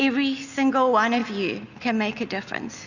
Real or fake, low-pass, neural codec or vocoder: real; 7.2 kHz; none